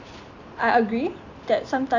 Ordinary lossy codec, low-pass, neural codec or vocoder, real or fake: none; 7.2 kHz; none; real